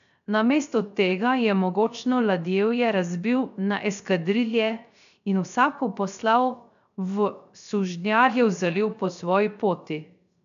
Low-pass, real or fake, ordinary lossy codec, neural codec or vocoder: 7.2 kHz; fake; none; codec, 16 kHz, 0.3 kbps, FocalCodec